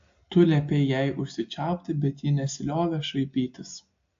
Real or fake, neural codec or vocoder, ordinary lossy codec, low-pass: real; none; AAC, 48 kbps; 7.2 kHz